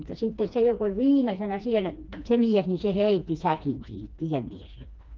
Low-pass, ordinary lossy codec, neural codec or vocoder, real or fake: 7.2 kHz; Opus, 24 kbps; codec, 16 kHz, 2 kbps, FreqCodec, smaller model; fake